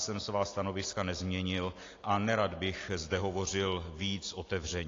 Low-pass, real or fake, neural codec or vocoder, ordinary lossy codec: 7.2 kHz; real; none; AAC, 32 kbps